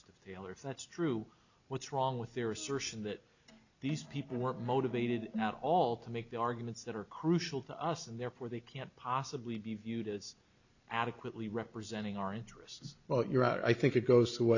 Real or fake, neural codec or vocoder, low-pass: real; none; 7.2 kHz